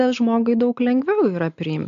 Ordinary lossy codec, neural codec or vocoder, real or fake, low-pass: AAC, 64 kbps; none; real; 7.2 kHz